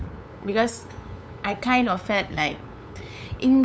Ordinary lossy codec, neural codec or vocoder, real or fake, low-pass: none; codec, 16 kHz, 8 kbps, FunCodec, trained on LibriTTS, 25 frames a second; fake; none